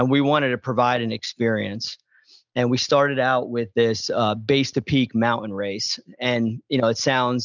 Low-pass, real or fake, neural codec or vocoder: 7.2 kHz; real; none